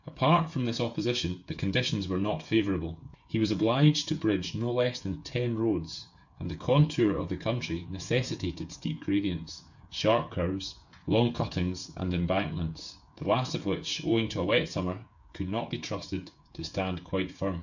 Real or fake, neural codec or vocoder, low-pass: fake; codec, 16 kHz, 8 kbps, FreqCodec, smaller model; 7.2 kHz